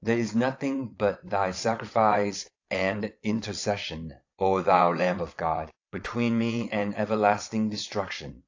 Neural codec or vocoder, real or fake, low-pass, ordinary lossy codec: vocoder, 22.05 kHz, 80 mel bands, Vocos; fake; 7.2 kHz; AAC, 48 kbps